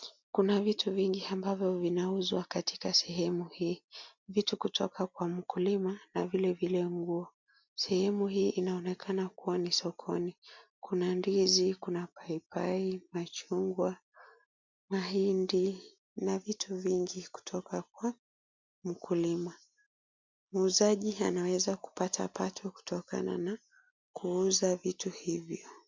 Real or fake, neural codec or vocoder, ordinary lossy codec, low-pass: real; none; MP3, 64 kbps; 7.2 kHz